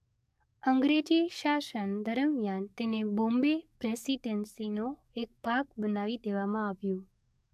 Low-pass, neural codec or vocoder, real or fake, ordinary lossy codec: 14.4 kHz; codec, 44.1 kHz, 7.8 kbps, DAC; fake; AAC, 96 kbps